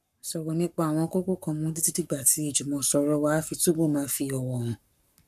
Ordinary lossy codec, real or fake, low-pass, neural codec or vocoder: none; fake; 14.4 kHz; codec, 44.1 kHz, 7.8 kbps, Pupu-Codec